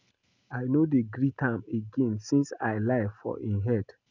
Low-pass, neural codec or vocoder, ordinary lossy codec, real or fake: 7.2 kHz; none; none; real